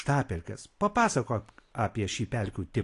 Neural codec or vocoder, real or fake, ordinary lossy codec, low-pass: none; real; AAC, 48 kbps; 10.8 kHz